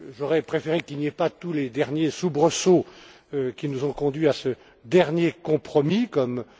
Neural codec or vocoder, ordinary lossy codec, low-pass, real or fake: none; none; none; real